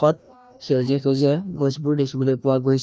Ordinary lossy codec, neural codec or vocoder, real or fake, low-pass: none; codec, 16 kHz, 1 kbps, FreqCodec, larger model; fake; none